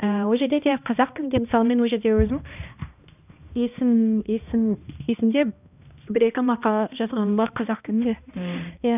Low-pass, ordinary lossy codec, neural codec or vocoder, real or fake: 3.6 kHz; none; codec, 16 kHz, 1 kbps, X-Codec, HuBERT features, trained on balanced general audio; fake